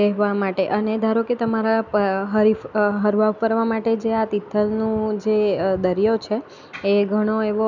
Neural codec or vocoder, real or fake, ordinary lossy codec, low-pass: none; real; none; 7.2 kHz